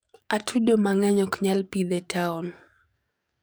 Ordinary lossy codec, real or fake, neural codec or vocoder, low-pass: none; fake; codec, 44.1 kHz, 7.8 kbps, DAC; none